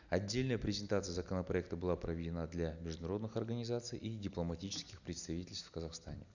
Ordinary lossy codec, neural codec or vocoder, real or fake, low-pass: none; none; real; 7.2 kHz